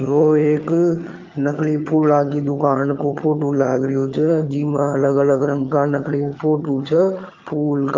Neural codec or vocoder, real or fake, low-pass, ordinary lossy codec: vocoder, 22.05 kHz, 80 mel bands, HiFi-GAN; fake; 7.2 kHz; Opus, 24 kbps